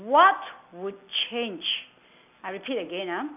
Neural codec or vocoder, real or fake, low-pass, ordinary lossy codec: none; real; 3.6 kHz; none